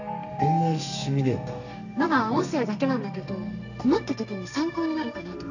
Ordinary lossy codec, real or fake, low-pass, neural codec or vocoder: none; fake; 7.2 kHz; codec, 32 kHz, 1.9 kbps, SNAC